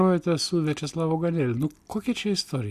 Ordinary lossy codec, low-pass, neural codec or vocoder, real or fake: Opus, 64 kbps; 14.4 kHz; none; real